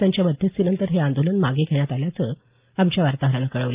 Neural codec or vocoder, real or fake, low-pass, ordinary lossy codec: none; real; 3.6 kHz; Opus, 24 kbps